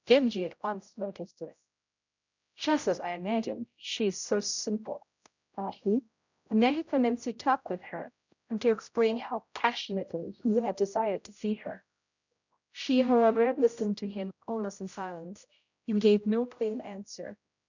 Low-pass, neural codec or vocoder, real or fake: 7.2 kHz; codec, 16 kHz, 0.5 kbps, X-Codec, HuBERT features, trained on general audio; fake